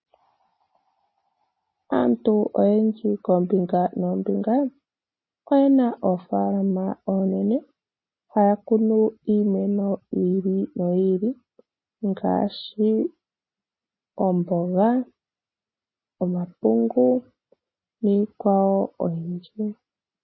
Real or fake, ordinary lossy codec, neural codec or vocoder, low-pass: real; MP3, 24 kbps; none; 7.2 kHz